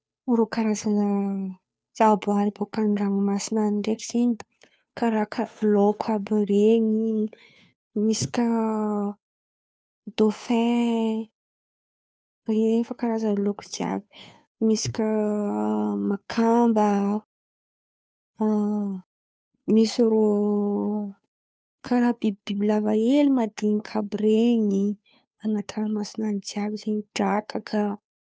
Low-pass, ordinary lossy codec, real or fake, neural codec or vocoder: none; none; fake; codec, 16 kHz, 2 kbps, FunCodec, trained on Chinese and English, 25 frames a second